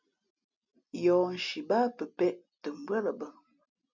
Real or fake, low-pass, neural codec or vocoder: real; 7.2 kHz; none